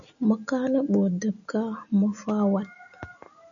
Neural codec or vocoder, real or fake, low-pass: none; real; 7.2 kHz